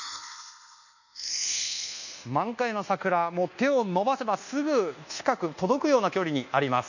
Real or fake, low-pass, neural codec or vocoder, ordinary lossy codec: fake; 7.2 kHz; codec, 24 kHz, 1.2 kbps, DualCodec; none